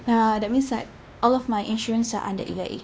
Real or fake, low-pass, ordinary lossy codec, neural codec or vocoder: fake; none; none; codec, 16 kHz, 2 kbps, X-Codec, WavLM features, trained on Multilingual LibriSpeech